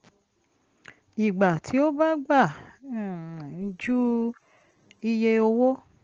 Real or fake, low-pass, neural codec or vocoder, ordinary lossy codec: real; 7.2 kHz; none; Opus, 16 kbps